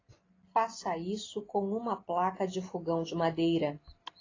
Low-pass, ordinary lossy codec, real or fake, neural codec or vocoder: 7.2 kHz; AAC, 32 kbps; real; none